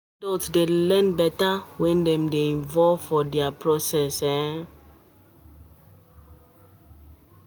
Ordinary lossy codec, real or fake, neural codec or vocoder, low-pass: none; real; none; none